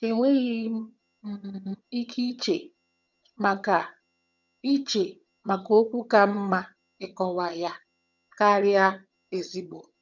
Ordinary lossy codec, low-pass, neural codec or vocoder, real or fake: none; 7.2 kHz; vocoder, 22.05 kHz, 80 mel bands, HiFi-GAN; fake